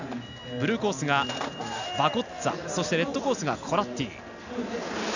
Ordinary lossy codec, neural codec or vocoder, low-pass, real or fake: none; none; 7.2 kHz; real